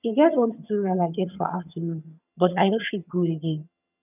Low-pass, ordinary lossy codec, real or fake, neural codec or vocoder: 3.6 kHz; none; fake; vocoder, 22.05 kHz, 80 mel bands, HiFi-GAN